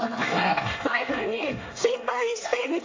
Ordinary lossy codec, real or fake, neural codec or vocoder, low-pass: AAC, 32 kbps; fake; codec, 24 kHz, 1 kbps, SNAC; 7.2 kHz